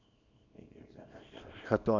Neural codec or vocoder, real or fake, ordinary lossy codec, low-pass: codec, 24 kHz, 0.9 kbps, WavTokenizer, small release; fake; none; 7.2 kHz